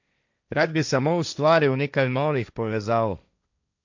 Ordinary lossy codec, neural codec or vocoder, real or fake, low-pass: none; codec, 16 kHz, 1.1 kbps, Voila-Tokenizer; fake; 7.2 kHz